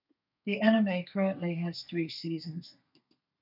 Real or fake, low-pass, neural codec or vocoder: fake; 5.4 kHz; codec, 32 kHz, 1.9 kbps, SNAC